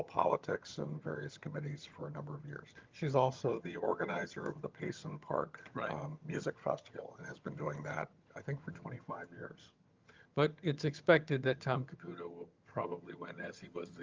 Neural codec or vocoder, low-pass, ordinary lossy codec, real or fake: vocoder, 22.05 kHz, 80 mel bands, HiFi-GAN; 7.2 kHz; Opus, 32 kbps; fake